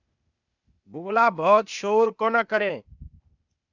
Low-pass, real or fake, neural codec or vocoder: 7.2 kHz; fake; codec, 16 kHz, 0.8 kbps, ZipCodec